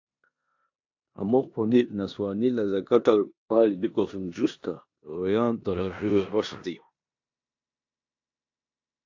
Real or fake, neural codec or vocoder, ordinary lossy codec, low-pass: fake; codec, 16 kHz in and 24 kHz out, 0.9 kbps, LongCat-Audio-Codec, four codebook decoder; AAC, 48 kbps; 7.2 kHz